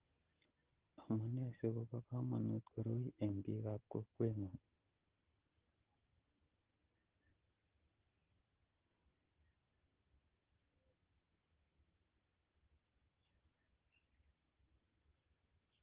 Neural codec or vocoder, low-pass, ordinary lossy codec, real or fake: none; 3.6 kHz; Opus, 16 kbps; real